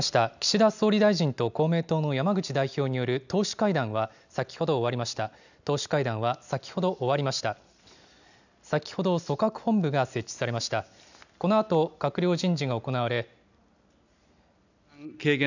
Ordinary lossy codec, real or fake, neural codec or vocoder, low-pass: none; real; none; 7.2 kHz